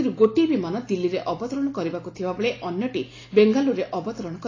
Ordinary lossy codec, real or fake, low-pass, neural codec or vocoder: AAC, 32 kbps; real; 7.2 kHz; none